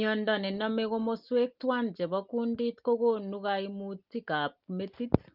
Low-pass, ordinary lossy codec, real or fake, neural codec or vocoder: 5.4 kHz; Opus, 32 kbps; real; none